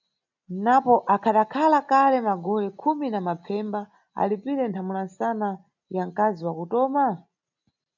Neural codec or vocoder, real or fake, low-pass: none; real; 7.2 kHz